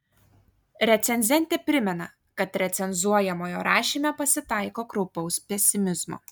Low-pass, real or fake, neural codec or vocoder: 19.8 kHz; real; none